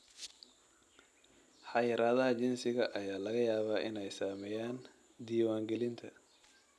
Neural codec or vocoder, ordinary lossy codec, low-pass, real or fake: none; none; none; real